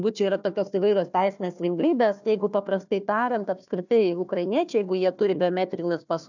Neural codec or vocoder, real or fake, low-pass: codec, 16 kHz, 1 kbps, FunCodec, trained on Chinese and English, 50 frames a second; fake; 7.2 kHz